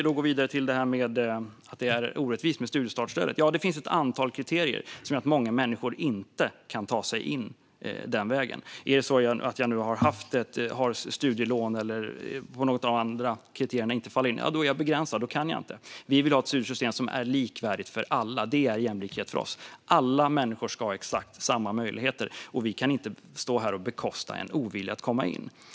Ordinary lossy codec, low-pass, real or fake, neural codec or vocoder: none; none; real; none